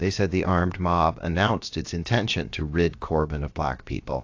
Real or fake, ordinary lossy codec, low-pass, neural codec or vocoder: fake; AAC, 48 kbps; 7.2 kHz; codec, 16 kHz, about 1 kbps, DyCAST, with the encoder's durations